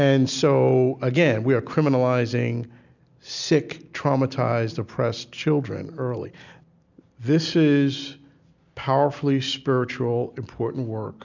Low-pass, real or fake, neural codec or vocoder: 7.2 kHz; real; none